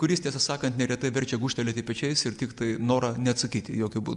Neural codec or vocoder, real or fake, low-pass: none; real; 10.8 kHz